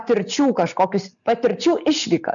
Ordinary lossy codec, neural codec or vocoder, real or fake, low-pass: MP3, 96 kbps; none; real; 7.2 kHz